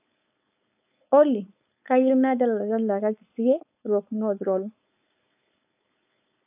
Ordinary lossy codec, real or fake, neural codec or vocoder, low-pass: AAC, 32 kbps; fake; codec, 16 kHz, 4.8 kbps, FACodec; 3.6 kHz